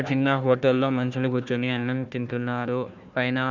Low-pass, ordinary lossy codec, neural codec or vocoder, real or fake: 7.2 kHz; none; codec, 16 kHz, 1 kbps, FunCodec, trained on Chinese and English, 50 frames a second; fake